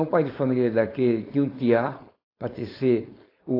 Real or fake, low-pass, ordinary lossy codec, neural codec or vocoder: fake; 5.4 kHz; AAC, 24 kbps; codec, 16 kHz, 4.8 kbps, FACodec